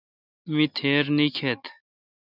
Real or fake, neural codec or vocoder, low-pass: real; none; 5.4 kHz